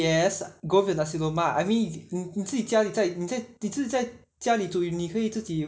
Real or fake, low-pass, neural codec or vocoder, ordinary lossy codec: real; none; none; none